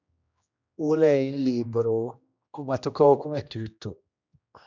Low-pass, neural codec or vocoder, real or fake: 7.2 kHz; codec, 16 kHz, 1 kbps, X-Codec, HuBERT features, trained on general audio; fake